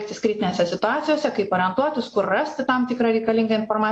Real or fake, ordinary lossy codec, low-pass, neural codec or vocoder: real; AAC, 48 kbps; 9.9 kHz; none